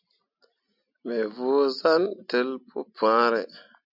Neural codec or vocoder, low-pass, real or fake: none; 5.4 kHz; real